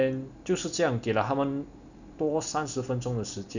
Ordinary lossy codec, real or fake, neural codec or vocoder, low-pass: none; real; none; 7.2 kHz